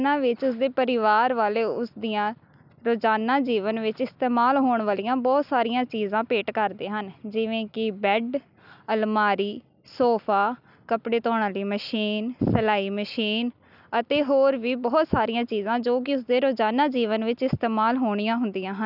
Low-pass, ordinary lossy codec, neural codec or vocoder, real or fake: 5.4 kHz; none; none; real